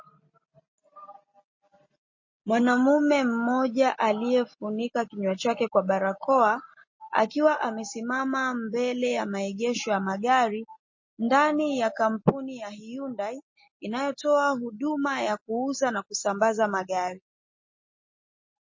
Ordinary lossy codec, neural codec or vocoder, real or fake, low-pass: MP3, 32 kbps; none; real; 7.2 kHz